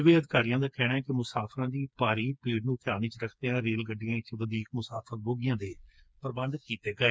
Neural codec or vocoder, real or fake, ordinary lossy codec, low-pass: codec, 16 kHz, 4 kbps, FreqCodec, smaller model; fake; none; none